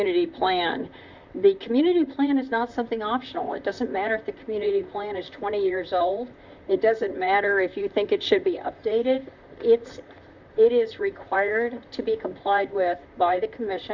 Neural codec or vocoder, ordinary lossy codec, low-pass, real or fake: vocoder, 44.1 kHz, 128 mel bands, Pupu-Vocoder; Opus, 64 kbps; 7.2 kHz; fake